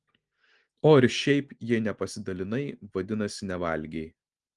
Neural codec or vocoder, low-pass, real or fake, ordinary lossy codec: none; 10.8 kHz; real; Opus, 24 kbps